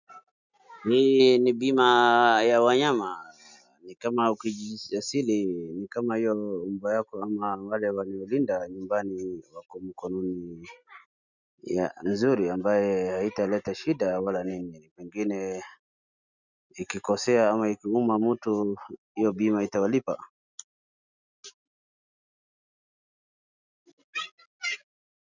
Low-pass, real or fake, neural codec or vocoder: 7.2 kHz; real; none